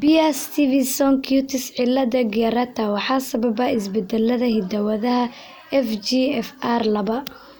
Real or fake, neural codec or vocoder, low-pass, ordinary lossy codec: real; none; none; none